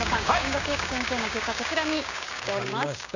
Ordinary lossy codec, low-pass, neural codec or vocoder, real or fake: none; 7.2 kHz; codec, 16 kHz, 6 kbps, DAC; fake